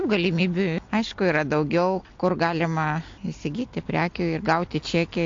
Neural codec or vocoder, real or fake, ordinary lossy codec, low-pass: none; real; AAC, 48 kbps; 7.2 kHz